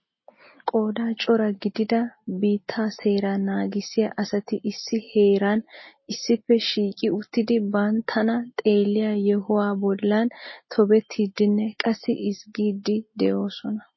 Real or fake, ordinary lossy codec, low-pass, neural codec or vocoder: real; MP3, 24 kbps; 7.2 kHz; none